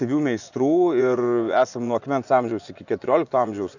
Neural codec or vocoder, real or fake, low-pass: vocoder, 24 kHz, 100 mel bands, Vocos; fake; 7.2 kHz